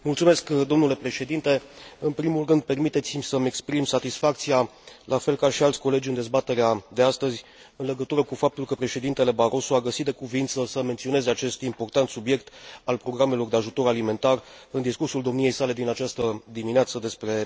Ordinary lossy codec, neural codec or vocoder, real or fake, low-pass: none; none; real; none